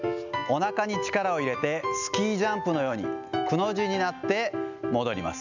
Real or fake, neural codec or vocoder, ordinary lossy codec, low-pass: real; none; none; 7.2 kHz